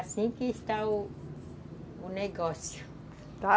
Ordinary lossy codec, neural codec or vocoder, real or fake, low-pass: none; none; real; none